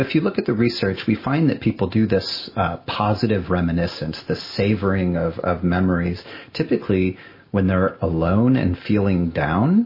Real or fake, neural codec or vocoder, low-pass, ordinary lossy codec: real; none; 5.4 kHz; MP3, 24 kbps